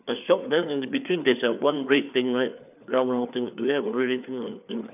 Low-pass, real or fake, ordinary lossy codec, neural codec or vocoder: 3.6 kHz; fake; none; codec, 16 kHz, 4 kbps, FreqCodec, larger model